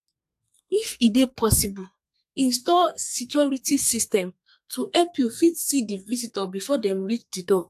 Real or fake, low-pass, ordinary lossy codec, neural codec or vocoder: fake; 14.4 kHz; AAC, 96 kbps; codec, 32 kHz, 1.9 kbps, SNAC